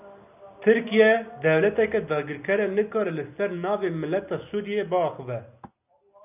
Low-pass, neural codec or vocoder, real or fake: 3.6 kHz; none; real